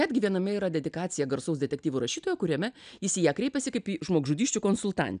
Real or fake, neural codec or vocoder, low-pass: real; none; 9.9 kHz